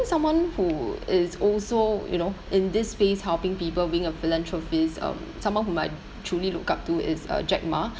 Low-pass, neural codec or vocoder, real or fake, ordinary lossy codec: none; none; real; none